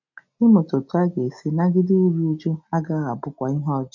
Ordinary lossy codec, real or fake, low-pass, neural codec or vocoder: none; real; 7.2 kHz; none